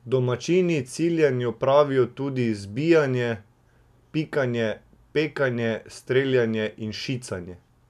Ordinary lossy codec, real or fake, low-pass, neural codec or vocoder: none; real; 14.4 kHz; none